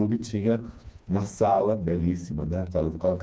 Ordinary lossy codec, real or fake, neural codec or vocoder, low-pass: none; fake; codec, 16 kHz, 2 kbps, FreqCodec, smaller model; none